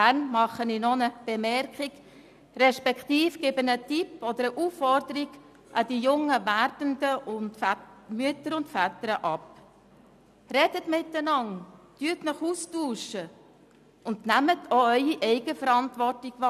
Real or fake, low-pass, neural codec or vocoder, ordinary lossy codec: real; 14.4 kHz; none; none